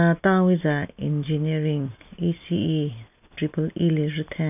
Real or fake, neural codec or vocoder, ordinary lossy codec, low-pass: real; none; none; 3.6 kHz